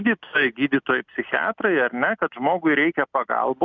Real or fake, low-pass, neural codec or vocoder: real; 7.2 kHz; none